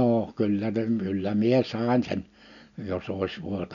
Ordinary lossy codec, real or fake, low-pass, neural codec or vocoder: none; real; 7.2 kHz; none